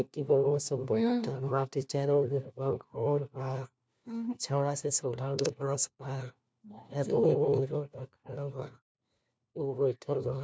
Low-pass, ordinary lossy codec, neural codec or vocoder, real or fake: none; none; codec, 16 kHz, 1 kbps, FunCodec, trained on LibriTTS, 50 frames a second; fake